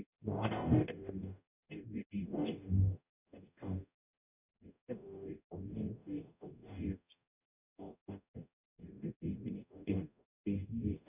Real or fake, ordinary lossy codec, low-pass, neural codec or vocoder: fake; none; 3.6 kHz; codec, 44.1 kHz, 0.9 kbps, DAC